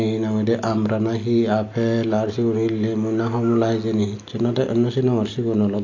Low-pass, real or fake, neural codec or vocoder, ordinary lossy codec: 7.2 kHz; real; none; AAC, 48 kbps